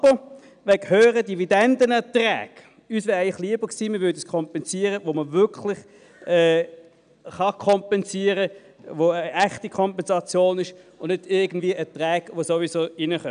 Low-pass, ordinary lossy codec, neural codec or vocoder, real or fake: 9.9 kHz; none; none; real